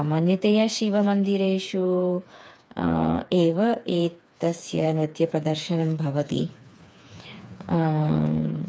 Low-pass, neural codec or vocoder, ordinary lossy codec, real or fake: none; codec, 16 kHz, 4 kbps, FreqCodec, smaller model; none; fake